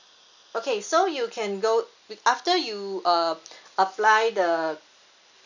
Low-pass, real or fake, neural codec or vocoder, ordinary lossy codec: 7.2 kHz; real; none; none